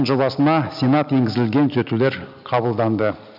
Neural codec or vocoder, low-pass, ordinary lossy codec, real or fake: none; 5.4 kHz; none; real